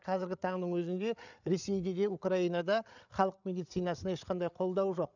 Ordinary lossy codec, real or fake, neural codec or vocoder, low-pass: none; fake; codec, 16 kHz, 8 kbps, FreqCodec, larger model; 7.2 kHz